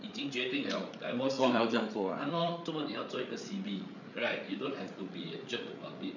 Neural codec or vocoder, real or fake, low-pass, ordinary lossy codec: codec, 16 kHz, 8 kbps, FreqCodec, larger model; fake; 7.2 kHz; none